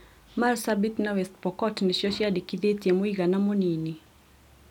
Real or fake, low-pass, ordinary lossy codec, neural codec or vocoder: real; 19.8 kHz; none; none